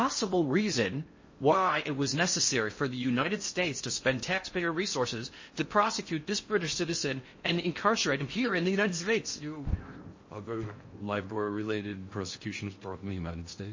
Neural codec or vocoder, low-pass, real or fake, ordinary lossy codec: codec, 16 kHz in and 24 kHz out, 0.6 kbps, FocalCodec, streaming, 4096 codes; 7.2 kHz; fake; MP3, 32 kbps